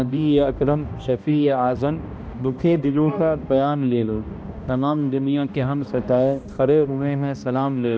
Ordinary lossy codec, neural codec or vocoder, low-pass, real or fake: none; codec, 16 kHz, 1 kbps, X-Codec, HuBERT features, trained on balanced general audio; none; fake